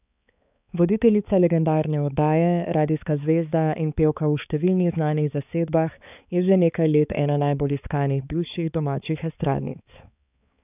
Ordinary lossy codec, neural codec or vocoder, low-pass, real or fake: none; codec, 16 kHz, 4 kbps, X-Codec, HuBERT features, trained on balanced general audio; 3.6 kHz; fake